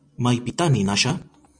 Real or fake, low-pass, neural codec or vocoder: real; 9.9 kHz; none